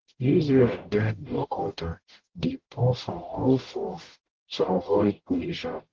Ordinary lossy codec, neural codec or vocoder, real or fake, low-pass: Opus, 16 kbps; codec, 44.1 kHz, 0.9 kbps, DAC; fake; 7.2 kHz